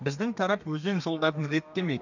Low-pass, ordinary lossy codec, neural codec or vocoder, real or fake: 7.2 kHz; none; codec, 32 kHz, 1.9 kbps, SNAC; fake